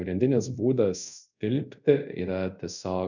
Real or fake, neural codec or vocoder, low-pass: fake; codec, 24 kHz, 0.5 kbps, DualCodec; 7.2 kHz